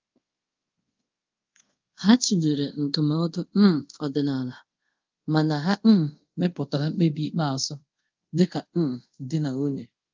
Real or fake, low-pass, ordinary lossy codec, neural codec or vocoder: fake; 7.2 kHz; Opus, 32 kbps; codec, 24 kHz, 0.5 kbps, DualCodec